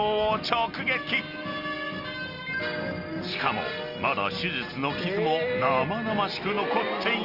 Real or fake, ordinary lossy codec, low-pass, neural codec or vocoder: real; Opus, 32 kbps; 5.4 kHz; none